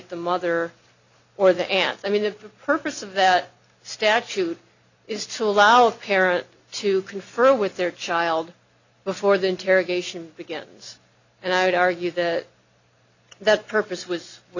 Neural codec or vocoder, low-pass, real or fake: none; 7.2 kHz; real